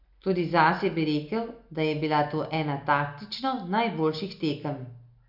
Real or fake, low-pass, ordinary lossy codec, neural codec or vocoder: real; 5.4 kHz; none; none